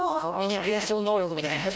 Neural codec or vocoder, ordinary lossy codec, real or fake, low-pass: codec, 16 kHz, 0.5 kbps, FreqCodec, larger model; none; fake; none